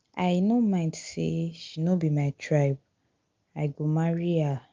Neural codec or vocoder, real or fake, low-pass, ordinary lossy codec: none; real; 7.2 kHz; Opus, 32 kbps